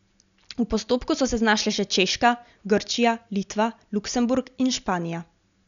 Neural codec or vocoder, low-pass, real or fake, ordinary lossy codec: none; 7.2 kHz; real; none